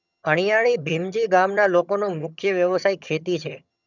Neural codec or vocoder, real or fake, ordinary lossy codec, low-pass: vocoder, 22.05 kHz, 80 mel bands, HiFi-GAN; fake; none; 7.2 kHz